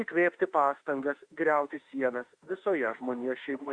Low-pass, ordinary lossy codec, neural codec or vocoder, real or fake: 9.9 kHz; Opus, 64 kbps; autoencoder, 48 kHz, 32 numbers a frame, DAC-VAE, trained on Japanese speech; fake